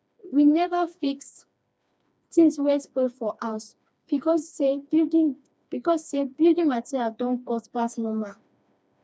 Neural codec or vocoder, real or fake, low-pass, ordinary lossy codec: codec, 16 kHz, 2 kbps, FreqCodec, smaller model; fake; none; none